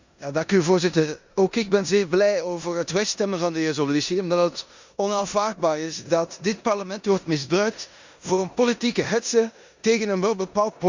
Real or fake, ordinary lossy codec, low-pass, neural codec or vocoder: fake; Opus, 64 kbps; 7.2 kHz; codec, 16 kHz in and 24 kHz out, 0.9 kbps, LongCat-Audio-Codec, four codebook decoder